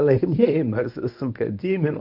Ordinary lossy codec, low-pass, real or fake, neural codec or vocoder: MP3, 48 kbps; 5.4 kHz; fake; codec, 24 kHz, 0.9 kbps, WavTokenizer, medium speech release version 1